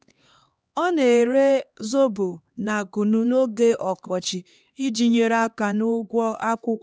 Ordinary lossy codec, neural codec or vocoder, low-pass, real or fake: none; codec, 16 kHz, 2 kbps, X-Codec, HuBERT features, trained on LibriSpeech; none; fake